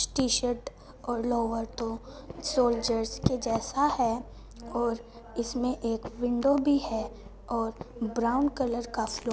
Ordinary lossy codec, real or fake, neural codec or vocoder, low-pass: none; real; none; none